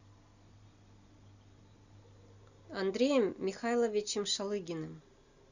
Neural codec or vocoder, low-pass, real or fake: none; 7.2 kHz; real